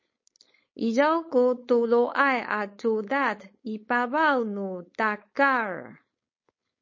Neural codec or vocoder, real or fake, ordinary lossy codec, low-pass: codec, 16 kHz, 4.8 kbps, FACodec; fake; MP3, 32 kbps; 7.2 kHz